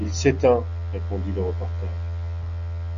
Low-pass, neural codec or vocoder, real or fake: 7.2 kHz; none; real